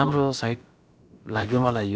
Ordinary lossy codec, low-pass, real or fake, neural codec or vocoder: none; none; fake; codec, 16 kHz, about 1 kbps, DyCAST, with the encoder's durations